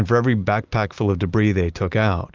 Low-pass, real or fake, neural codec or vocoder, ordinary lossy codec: 7.2 kHz; real; none; Opus, 24 kbps